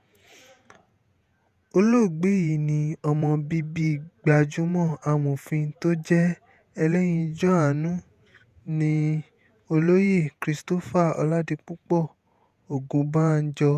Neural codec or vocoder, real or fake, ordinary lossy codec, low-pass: vocoder, 48 kHz, 128 mel bands, Vocos; fake; none; 14.4 kHz